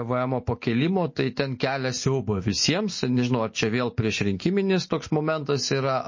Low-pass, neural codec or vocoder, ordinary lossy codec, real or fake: 7.2 kHz; none; MP3, 32 kbps; real